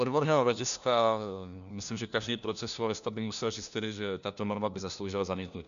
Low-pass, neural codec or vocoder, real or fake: 7.2 kHz; codec, 16 kHz, 1 kbps, FunCodec, trained on LibriTTS, 50 frames a second; fake